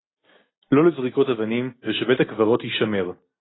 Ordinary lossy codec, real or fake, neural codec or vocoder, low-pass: AAC, 16 kbps; real; none; 7.2 kHz